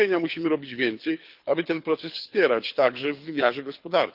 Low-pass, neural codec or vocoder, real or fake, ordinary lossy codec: 5.4 kHz; codec, 24 kHz, 6 kbps, HILCodec; fake; Opus, 24 kbps